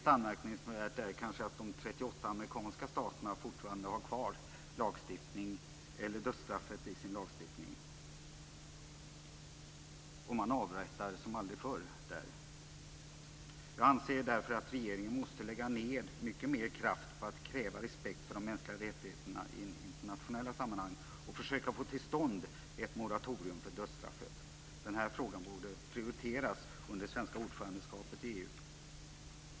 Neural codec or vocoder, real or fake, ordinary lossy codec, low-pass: none; real; none; none